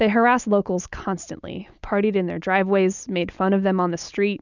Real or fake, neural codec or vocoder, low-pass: real; none; 7.2 kHz